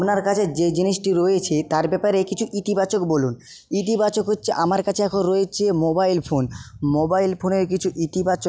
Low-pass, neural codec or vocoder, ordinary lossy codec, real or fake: none; none; none; real